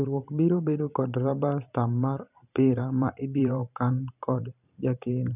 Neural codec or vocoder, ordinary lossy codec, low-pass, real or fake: vocoder, 44.1 kHz, 128 mel bands every 512 samples, BigVGAN v2; none; 3.6 kHz; fake